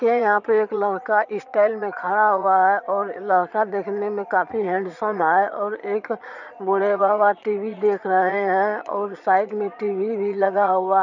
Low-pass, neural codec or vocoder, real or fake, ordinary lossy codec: 7.2 kHz; vocoder, 22.05 kHz, 80 mel bands, Vocos; fake; none